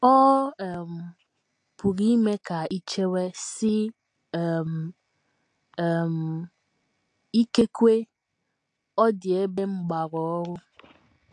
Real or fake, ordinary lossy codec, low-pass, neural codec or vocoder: real; AAC, 48 kbps; 9.9 kHz; none